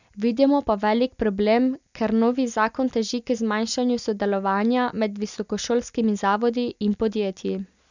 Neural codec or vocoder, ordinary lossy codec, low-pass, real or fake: none; none; 7.2 kHz; real